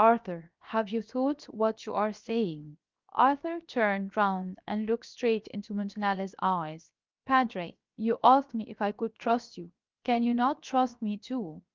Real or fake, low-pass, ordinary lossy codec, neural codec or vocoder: fake; 7.2 kHz; Opus, 24 kbps; codec, 16 kHz, 0.7 kbps, FocalCodec